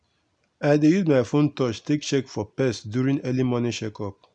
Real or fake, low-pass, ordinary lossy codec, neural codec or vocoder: real; 9.9 kHz; none; none